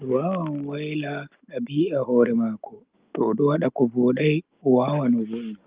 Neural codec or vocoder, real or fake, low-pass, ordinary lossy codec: none; real; 3.6 kHz; Opus, 32 kbps